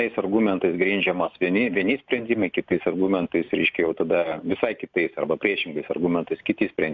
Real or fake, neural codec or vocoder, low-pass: real; none; 7.2 kHz